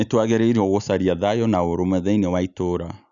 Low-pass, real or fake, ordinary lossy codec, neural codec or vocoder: 7.2 kHz; real; none; none